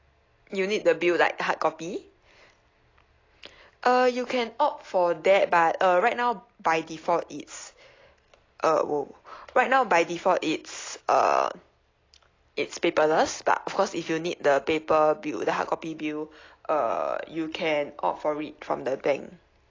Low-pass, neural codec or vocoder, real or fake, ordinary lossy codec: 7.2 kHz; none; real; AAC, 32 kbps